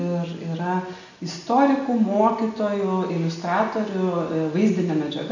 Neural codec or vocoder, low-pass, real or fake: none; 7.2 kHz; real